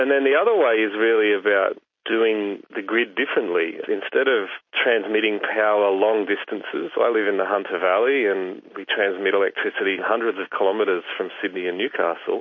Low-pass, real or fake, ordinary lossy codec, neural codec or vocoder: 7.2 kHz; real; MP3, 32 kbps; none